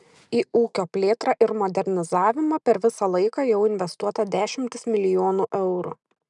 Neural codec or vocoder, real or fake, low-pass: none; real; 10.8 kHz